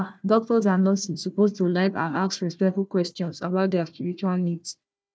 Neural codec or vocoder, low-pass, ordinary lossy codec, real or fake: codec, 16 kHz, 1 kbps, FunCodec, trained on Chinese and English, 50 frames a second; none; none; fake